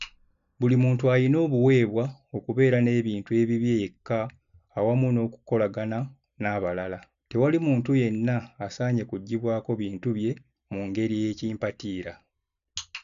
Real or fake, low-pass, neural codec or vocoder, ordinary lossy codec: real; 7.2 kHz; none; none